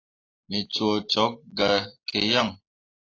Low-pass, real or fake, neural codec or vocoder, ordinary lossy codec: 7.2 kHz; real; none; AAC, 32 kbps